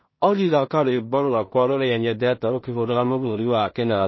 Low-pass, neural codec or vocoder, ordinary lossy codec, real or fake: 7.2 kHz; codec, 16 kHz in and 24 kHz out, 0.4 kbps, LongCat-Audio-Codec, two codebook decoder; MP3, 24 kbps; fake